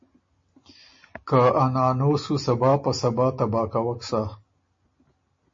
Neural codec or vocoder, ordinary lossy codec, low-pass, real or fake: none; MP3, 32 kbps; 7.2 kHz; real